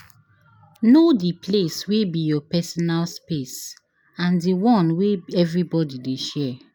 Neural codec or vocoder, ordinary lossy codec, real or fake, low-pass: none; none; real; 19.8 kHz